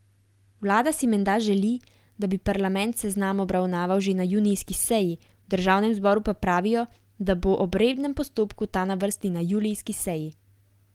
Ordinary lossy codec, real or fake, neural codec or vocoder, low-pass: Opus, 24 kbps; real; none; 19.8 kHz